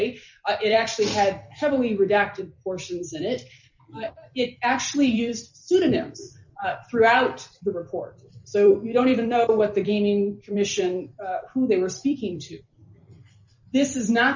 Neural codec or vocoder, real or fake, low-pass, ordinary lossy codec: vocoder, 44.1 kHz, 128 mel bands every 256 samples, BigVGAN v2; fake; 7.2 kHz; MP3, 64 kbps